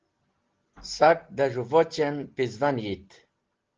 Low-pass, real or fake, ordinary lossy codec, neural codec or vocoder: 7.2 kHz; real; Opus, 16 kbps; none